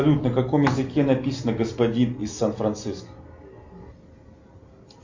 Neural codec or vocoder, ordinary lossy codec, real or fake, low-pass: none; MP3, 48 kbps; real; 7.2 kHz